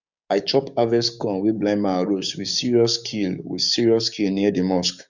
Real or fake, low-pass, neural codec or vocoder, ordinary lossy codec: fake; 7.2 kHz; codec, 16 kHz, 6 kbps, DAC; none